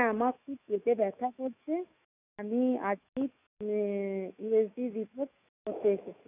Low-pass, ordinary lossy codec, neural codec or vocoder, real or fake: 3.6 kHz; none; autoencoder, 48 kHz, 128 numbers a frame, DAC-VAE, trained on Japanese speech; fake